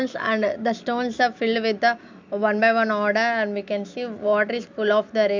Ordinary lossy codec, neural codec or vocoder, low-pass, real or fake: MP3, 64 kbps; none; 7.2 kHz; real